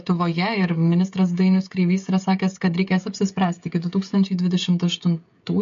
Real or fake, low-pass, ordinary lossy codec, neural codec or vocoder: fake; 7.2 kHz; MP3, 48 kbps; codec, 16 kHz, 16 kbps, FreqCodec, smaller model